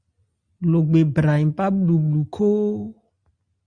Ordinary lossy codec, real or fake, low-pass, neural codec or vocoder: Opus, 64 kbps; real; 9.9 kHz; none